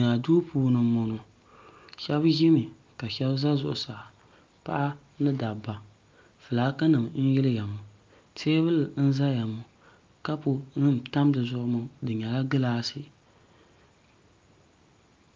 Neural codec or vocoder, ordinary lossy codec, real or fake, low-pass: none; Opus, 24 kbps; real; 7.2 kHz